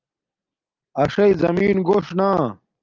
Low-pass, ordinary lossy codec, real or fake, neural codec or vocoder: 7.2 kHz; Opus, 32 kbps; real; none